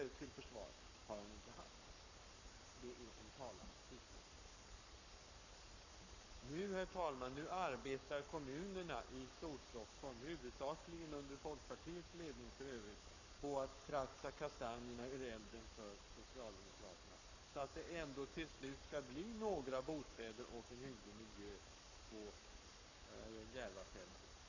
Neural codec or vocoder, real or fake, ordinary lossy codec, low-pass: codec, 44.1 kHz, 7.8 kbps, Pupu-Codec; fake; none; 7.2 kHz